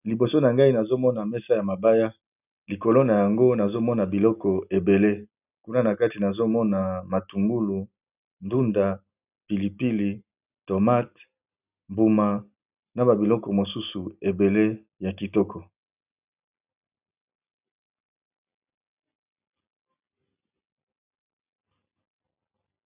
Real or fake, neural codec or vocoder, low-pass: real; none; 3.6 kHz